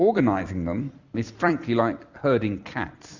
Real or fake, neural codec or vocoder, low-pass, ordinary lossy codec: fake; vocoder, 44.1 kHz, 128 mel bands every 256 samples, BigVGAN v2; 7.2 kHz; Opus, 64 kbps